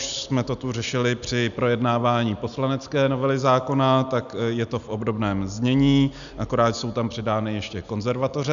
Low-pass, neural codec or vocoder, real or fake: 7.2 kHz; none; real